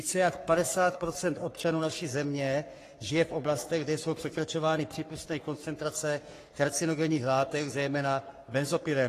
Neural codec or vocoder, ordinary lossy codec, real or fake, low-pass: codec, 44.1 kHz, 3.4 kbps, Pupu-Codec; AAC, 48 kbps; fake; 14.4 kHz